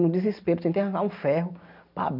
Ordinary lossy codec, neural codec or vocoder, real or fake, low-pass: none; none; real; 5.4 kHz